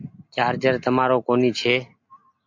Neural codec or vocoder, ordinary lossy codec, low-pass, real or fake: none; MP3, 48 kbps; 7.2 kHz; real